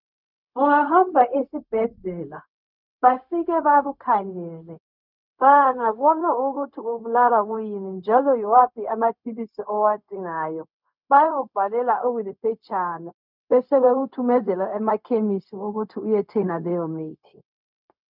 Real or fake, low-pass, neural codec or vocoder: fake; 5.4 kHz; codec, 16 kHz, 0.4 kbps, LongCat-Audio-Codec